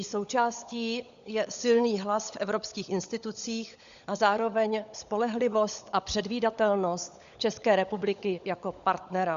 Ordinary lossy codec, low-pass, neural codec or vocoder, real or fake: Opus, 64 kbps; 7.2 kHz; codec, 16 kHz, 16 kbps, FunCodec, trained on LibriTTS, 50 frames a second; fake